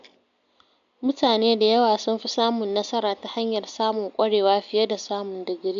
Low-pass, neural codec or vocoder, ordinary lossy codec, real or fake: 7.2 kHz; none; MP3, 64 kbps; real